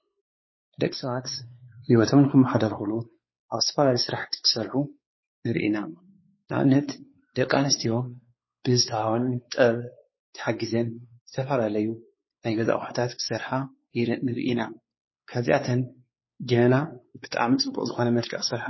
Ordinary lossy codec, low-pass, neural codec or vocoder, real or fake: MP3, 24 kbps; 7.2 kHz; codec, 16 kHz, 4 kbps, X-Codec, WavLM features, trained on Multilingual LibriSpeech; fake